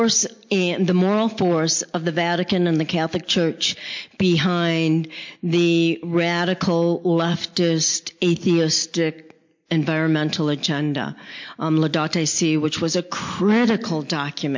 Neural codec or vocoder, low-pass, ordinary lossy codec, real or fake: none; 7.2 kHz; MP3, 48 kbps; real